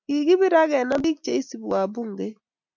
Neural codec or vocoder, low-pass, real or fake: none; 7.2 kHz; real